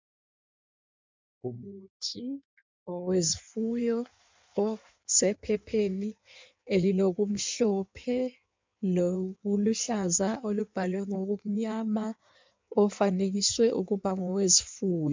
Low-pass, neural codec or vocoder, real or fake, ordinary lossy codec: 7.2 kHz; codec, 16 kHz in and 24 kHz out, 1.1 kbps, FireRedTTS-2 codec; fake; MP3, 64 kbps